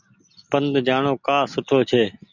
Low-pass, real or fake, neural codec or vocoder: 7.2 kHz; real; none